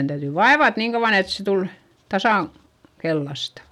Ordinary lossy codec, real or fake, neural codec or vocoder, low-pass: none; real; none; 19.8 kHz